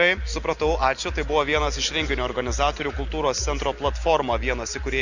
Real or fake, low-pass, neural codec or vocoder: real; 7.2 kHz; none